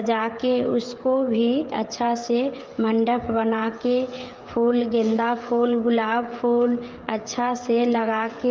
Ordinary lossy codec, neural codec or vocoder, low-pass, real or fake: Opus, 32 kbps; codec, 16 kHz, 16 kbps, FreqCodec, smaller model; 7.2 kHz; fake